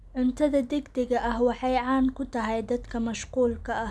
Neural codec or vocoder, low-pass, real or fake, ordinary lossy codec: none; none; real; none